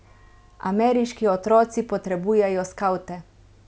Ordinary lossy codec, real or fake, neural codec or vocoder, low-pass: none; real; none; none